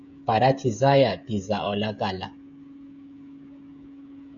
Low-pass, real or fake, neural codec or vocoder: 7.2 kHz; fake; codec, 16 kHz, 16 kbps, FreqCodec, smaller model